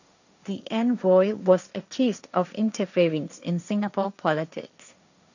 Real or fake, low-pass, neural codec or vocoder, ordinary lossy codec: fake; 7.2 kHz; codec, 16 kHz, 1.1 kbps, Voila-Tokenizer; none